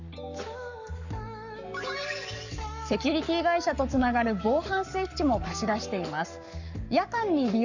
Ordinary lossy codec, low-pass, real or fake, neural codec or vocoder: none; 7.2 kHz; fake; codec, 44.1 kHz, 7.8 kbps, Pupu-Codec